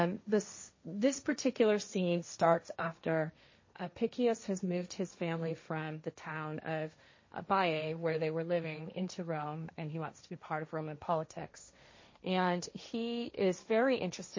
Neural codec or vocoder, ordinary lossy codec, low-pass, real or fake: codec, 16 kHz, 1.1 kbps, Voila-Tokenizer; MP3, 32 kbps; 7.2 kHz; fake